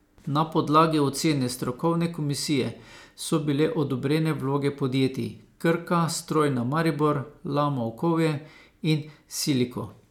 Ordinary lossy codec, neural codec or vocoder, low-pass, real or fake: none; none; 19.8 kHz; real